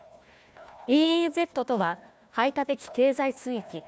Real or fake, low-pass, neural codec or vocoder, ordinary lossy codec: fake; none; codec, 16 kHz, 1 kbps, FunCodec, trained on Chinese and English, 50 frames a second; none